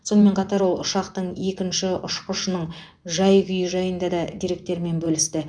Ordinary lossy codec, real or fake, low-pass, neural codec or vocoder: none; fake; 9.9 kHz; vocoder, 24 kHz, 100 mel bands, Vocos